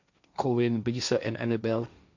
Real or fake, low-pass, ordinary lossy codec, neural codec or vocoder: fake; 7.2 kHz; none; codec, 16 kHz, 1.1 kbps, Voila-Tokenizer